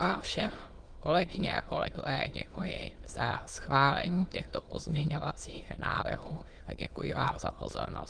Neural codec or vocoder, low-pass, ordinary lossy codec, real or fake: autoencoder, 22.05 kHz, a latent of 192 numbers a frame, VITS, trained on many speakers; 9.9 kHz; Opus, 24 kbps; fake